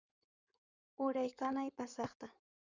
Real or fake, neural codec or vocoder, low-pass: fake; codec, 16 kHz in and 24 kHz out, 2.2 kbps, FireRedTTS-2 codec; 7.2 kHz